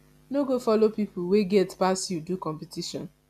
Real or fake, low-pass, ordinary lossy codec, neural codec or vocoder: real; 14.4 kHz; none; none